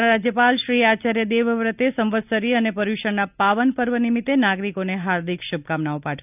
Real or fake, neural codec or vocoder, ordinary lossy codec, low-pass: real; none; none; 3.6 kHz